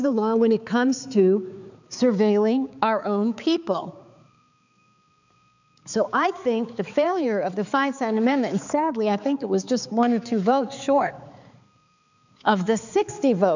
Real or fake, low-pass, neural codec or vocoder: fake; 7.2 kHz; codec, 16 kHz, 4 kbps, X-Codec, HuBERT features, trained on balanced general audio